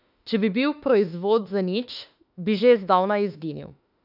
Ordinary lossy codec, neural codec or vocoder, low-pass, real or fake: none; autoencoder, 48 kHz, 32 numbers a frame, DAC-VAE, trained on Japanese speech; 5.4 kHz; fake